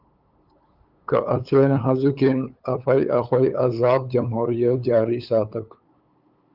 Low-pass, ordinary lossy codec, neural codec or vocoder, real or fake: 5.4 kHz; Opus, 24 kbps; codec, 16 kHz, 8 kbps, FunCodec, trained on LibriTTS, 25 frames a second; fake